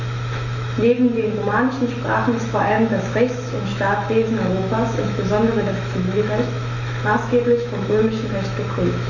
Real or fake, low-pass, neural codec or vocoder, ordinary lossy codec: fake; 7.2 kHz; autoencoder, 48 kHz, 128 numbers a frame, DAC-VAE, trained on Japanese speech; none